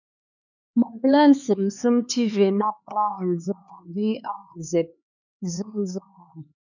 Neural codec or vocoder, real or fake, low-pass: codec, 16 kHz, 4 kbps, X-Codec, HuBERT features, trained on LibriSpeech; fake; 7.2 kHz